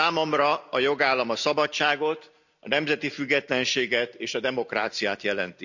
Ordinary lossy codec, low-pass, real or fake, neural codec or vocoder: none; 7.2 kHz; real; none